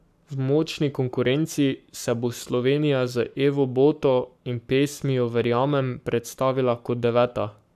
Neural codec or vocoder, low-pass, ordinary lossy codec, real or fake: codec, 44.1 kHz, 7.8 kbps, Pupu-Codec; 14.4 kHz; none; fake